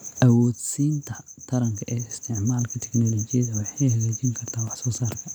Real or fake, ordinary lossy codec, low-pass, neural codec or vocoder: real; none; none; none